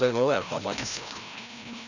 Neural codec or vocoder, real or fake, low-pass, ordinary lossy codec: codec, 16 kHz, 1 kbps, FreqCodec, larger model; fake; 7.2 kHz; none